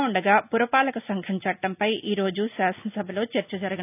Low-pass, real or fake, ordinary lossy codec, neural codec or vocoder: 3.6 kHz; real; none; none